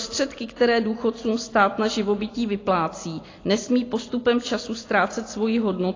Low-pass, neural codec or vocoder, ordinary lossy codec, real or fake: 7.2 kHz; none; AAC, 32 kbps; real